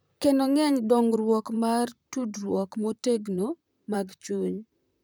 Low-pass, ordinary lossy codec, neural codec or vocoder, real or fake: none; none; vocoder, 44.1 kHz, 128 mel bands, Pupu-Vocoder; fake